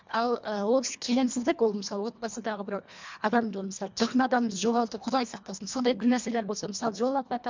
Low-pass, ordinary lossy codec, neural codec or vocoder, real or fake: 7.2 kHz; MP3, 64 kbps; codec, 24 kHz, 1.5 kbps, HILCodec; fake